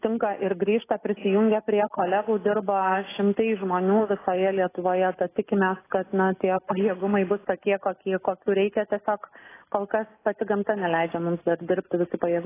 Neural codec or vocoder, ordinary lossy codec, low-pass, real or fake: none; AAC, 16 kbps; 3.6 kHz; real